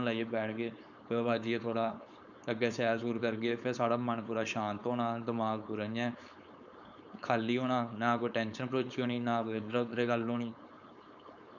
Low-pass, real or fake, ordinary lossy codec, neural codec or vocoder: 7.2 kHz; fake; none; codec, 16 kHz, 4.8 kbps, FACodec